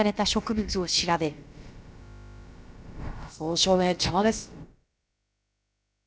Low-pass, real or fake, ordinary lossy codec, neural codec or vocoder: none; fake; none; codec, 16 kHz, about 1 kbps, DyCAST, with the encoder's durations